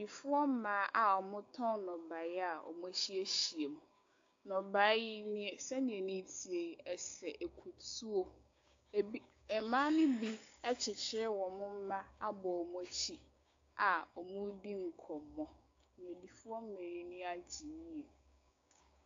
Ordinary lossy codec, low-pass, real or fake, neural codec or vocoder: AAC, 48 kbps; 7.2 kHz; fake; codec, 16 kHz, 6 kbps, DAC